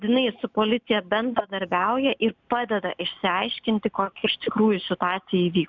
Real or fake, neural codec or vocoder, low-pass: real; none; 7.2 kHz